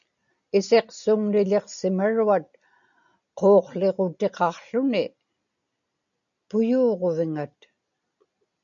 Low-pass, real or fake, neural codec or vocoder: 7.2 kHz; real; none